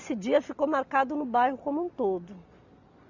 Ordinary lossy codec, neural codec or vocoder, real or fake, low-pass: none; none; real; 7.2 kHz